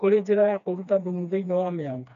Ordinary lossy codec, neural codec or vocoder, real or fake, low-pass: none; codec, 16 kHz, 2 kbps, FreqCodec, smaller model; fake; 7.2 kHz